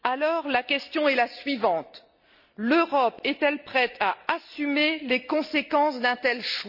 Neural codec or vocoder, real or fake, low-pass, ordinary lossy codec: none; real; 5.4 kHz; Opus, 64 kbps